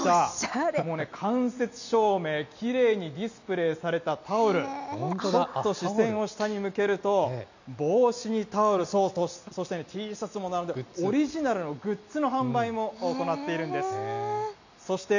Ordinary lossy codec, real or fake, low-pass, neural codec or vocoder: none; real; 7.2 kHz; none